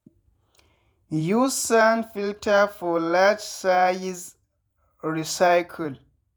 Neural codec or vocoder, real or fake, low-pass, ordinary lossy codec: vocoder, 48 kHz, 128 mel bands, Vocos; fake; none; none